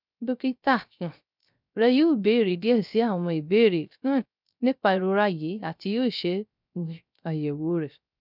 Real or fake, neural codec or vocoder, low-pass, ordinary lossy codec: fake; codec, 16 kHz, 0.3 kbps, FocalCodec; 5.4 kHz; none